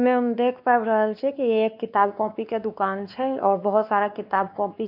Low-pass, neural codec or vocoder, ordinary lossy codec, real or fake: 5.4 kHz; codec, 16 kHz, 2 kbps, X-Codec, WavLM features, trained on Multilingual LibriSpeech; none; fake